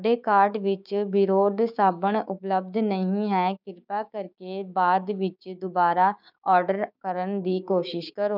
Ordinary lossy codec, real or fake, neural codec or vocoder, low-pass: none; fake; codec, 16 kHz, 6 kbps, DAC; 5.4 kHz